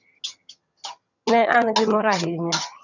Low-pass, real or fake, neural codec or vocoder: 7.2 kHz; fake; vocoder, 22.05 kHz, 80 mel bands, HiFi-GAN